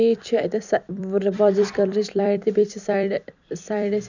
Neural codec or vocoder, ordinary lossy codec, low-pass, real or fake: vocoder, 44.1 kHz, 128 mel bands every 256 samples, BigVGAN v2; none; 7.2 kHz; fake